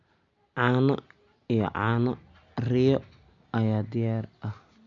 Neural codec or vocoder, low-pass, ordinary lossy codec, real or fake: none; 7.2 kHz; none; real